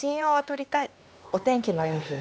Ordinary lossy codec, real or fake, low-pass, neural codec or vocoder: none; fake; none; codec, 16 kHz, 2 kbps, X-Codec, HuBERT features, trained on LibriSpeech